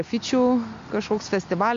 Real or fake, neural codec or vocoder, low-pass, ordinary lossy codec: real; none; 7.2 kHz; MP3, 48 kbps